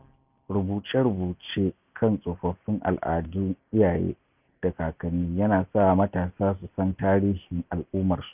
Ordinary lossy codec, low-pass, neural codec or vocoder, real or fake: none; 3.6 kHz; none; real